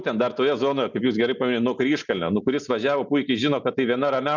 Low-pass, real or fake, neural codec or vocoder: 7.2 kHz; real; none